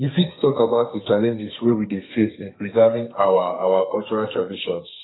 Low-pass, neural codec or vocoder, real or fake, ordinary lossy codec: 7.2 kHz; codec, 32 kHz, 1.9 kbps, SNAC; fake; AAC, 16 kbps